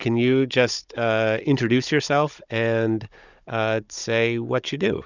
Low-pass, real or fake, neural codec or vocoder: 7.2 kHz; fake; codec, 16 kHz, 16 kbps, FunCodec, trained on Chinese and English, 50 frames a second